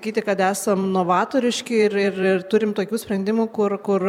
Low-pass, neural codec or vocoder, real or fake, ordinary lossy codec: 19.8 kHz; vocoder, 44.1 kHz, 128 mel bands every 512 samples, BigVGAN v2; fake; MP3, 96 kbps